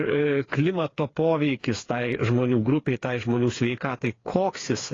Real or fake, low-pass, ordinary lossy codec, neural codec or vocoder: fake; 7.2 kHz; AAC, 32 kbps; codec, 16 kHz, 4 kbps, FreqCodec, smaller model